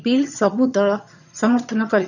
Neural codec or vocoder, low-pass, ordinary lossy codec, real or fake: vocoder, 22.05 kHz, 80 mel bands, HiFi-GAN; 7.2 kHz; none; fake